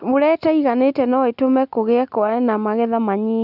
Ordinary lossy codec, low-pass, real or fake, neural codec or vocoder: none; 5.4 kHz; real; none